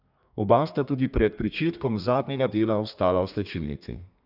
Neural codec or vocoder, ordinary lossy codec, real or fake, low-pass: codec, 32 kHz, 1.9 kbps, SNAC; none; fake; 5.4 kHz